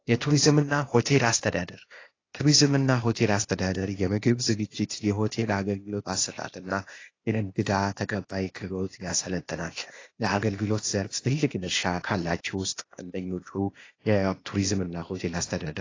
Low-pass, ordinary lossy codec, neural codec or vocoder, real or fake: 7.2 kHz; AAC, 32 kbps; codec, 16 kHz, 0.8 kbps, ZipCodec; fake